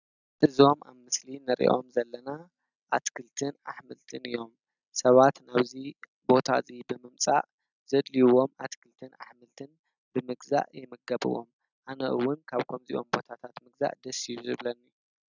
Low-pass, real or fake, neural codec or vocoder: 7.2 kHz; real; none